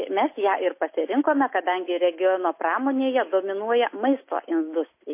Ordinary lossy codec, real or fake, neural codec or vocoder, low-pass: MP3, 24 kbps; real; none; 3.6 kHz